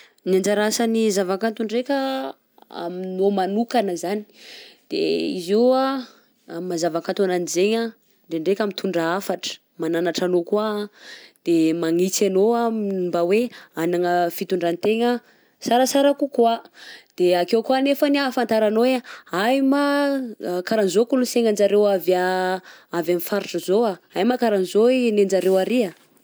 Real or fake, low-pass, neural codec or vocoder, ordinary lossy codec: real; none; none; none